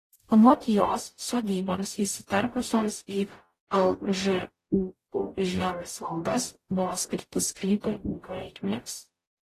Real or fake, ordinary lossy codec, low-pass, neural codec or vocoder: fake; AAC, 48 kbps; 14.4 kHz; codec, 44.1 kHz, 0.9 kbps, DAC